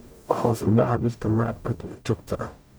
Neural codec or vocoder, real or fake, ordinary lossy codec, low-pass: codec, 44.1 kHz, 0.9 kbps, DAC; fake; none; none